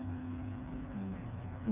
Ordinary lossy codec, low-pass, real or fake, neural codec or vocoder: none; 3.6 kHz; fake; codec, 16 kHz, 4 kbps, FreqCodec, smaller model